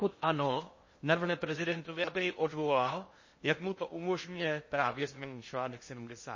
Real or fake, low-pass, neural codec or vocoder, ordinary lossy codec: fake; 7.2 kHz; codec, 16 kHz in and 24 kHz out, 0.6 kbps, FocalCodec, streaming, 2048 codes; MP3, 32 kbps